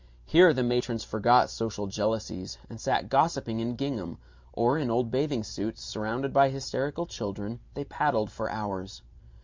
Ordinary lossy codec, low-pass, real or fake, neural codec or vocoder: MP3, 64 kbps; 7.2 kHz; fake; vocoder, 44.1 kHz, 128 mel bands every 512 samples, BigVGAN v2